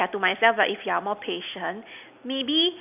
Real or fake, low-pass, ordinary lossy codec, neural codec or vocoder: real; 3.6 kHz; none; none